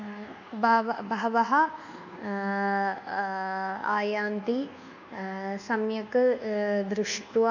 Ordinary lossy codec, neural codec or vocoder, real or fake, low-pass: none; codec, 24 kHz, 1.2 kbps, DualCodec; fake; 7.2 kHz